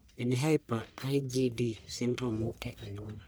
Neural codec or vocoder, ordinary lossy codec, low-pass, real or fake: codec, 44.1 kHz, 1.7 kbps, Pupu-Codec; none; none; fake